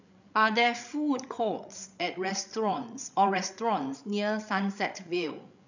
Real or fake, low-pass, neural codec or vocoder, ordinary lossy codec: fake; 7.2 kHz; codec, 16 kHz, 8 kbps, FreqCodec, larger model; none